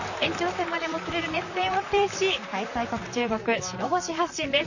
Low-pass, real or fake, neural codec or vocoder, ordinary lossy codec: 7.2 kHz; fake; vocoder, 44.1 kHz, 128 mel bands, Pupu-Vocoder; none